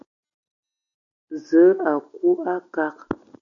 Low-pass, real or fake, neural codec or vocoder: 7.2 kHz; real; none